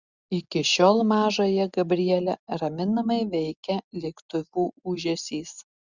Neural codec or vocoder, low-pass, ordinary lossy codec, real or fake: none; 7.2 kHz; Opus, 64 kbps; real